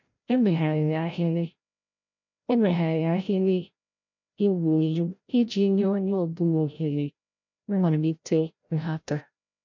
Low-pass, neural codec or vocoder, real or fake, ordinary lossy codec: 7.2 kHz; codec, 16 kHz, 0.5 kbps, FreqCodec, larger model; fake; none